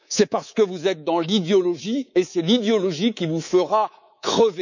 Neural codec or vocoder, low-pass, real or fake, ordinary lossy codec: codec, 24 kHz, 3.1 kbps, DualCodec; 7.2 kHz; fake; none